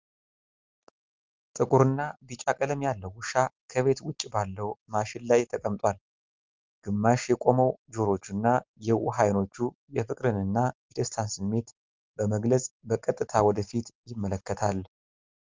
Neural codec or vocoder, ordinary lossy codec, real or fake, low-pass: none; Opus, 32 kbps; real; 7.2 kHz